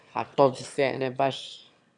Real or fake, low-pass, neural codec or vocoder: fake; 9.9 kHz; autoencoder, 22.05 kHz, a latent of 192 numbers a frame, VITS, trained on one speaker